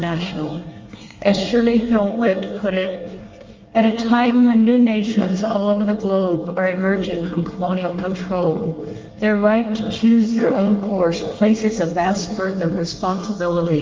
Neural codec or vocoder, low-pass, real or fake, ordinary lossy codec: codec, 24 kHz, 1 kbps, SNAC; 7.2 kHz; fake; Opus, 32 kbps